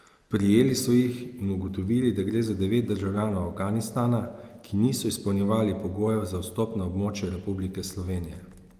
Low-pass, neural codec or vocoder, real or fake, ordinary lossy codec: 14.4 kHz; none; real; Opus, 32 kbps